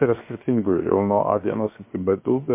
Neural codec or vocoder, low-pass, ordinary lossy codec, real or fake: codec, 16 kHz, 0.7 kbps, FocalCodec; 3.6 kHz; MP3, 24 kbps; fake